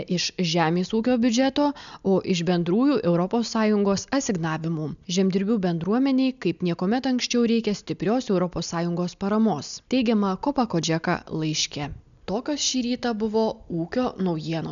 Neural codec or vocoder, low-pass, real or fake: none; 7.2 kHz; real